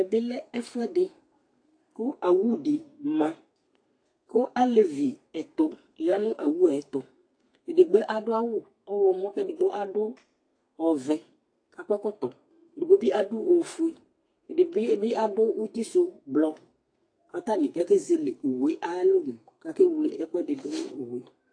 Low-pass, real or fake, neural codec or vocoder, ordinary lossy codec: 9.9 kHz; fake; codec, 32 kHz, 1.9 kbps, SNAC; AAC, 48 kbps